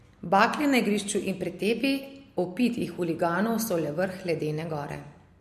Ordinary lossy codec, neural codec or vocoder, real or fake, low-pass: MP3, 64 kbps; none; real; 14.4 kHz